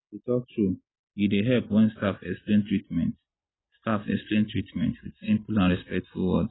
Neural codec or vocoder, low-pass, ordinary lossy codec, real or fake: none; 7.2 kHz; AAC, 16 kbps; real